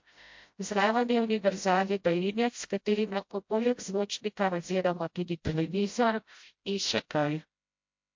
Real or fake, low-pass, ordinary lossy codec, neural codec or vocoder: fake; 7.2 kHz; MP3, 48 kbps; codec, 16 kHz, 0.5 kbps, FreqCodec, smaller model